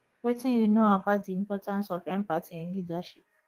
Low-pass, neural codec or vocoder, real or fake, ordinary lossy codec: 14.4 kHz; codec, 32 kHz, 1.9 kbps, SNAC; fake; Opus, 24 kbps